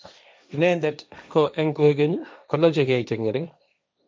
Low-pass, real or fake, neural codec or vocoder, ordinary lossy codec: none; fake; codec, 16 kHz, 1.1 kbps, Voila-Tokenizer; none